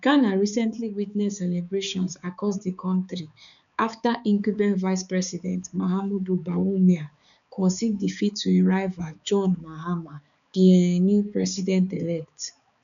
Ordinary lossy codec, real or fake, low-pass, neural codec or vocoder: none; fake; 7.2 kHz; codec, 16 kHz, 4 kbps, X-Codec, HuBERT features, trained on balanced general audio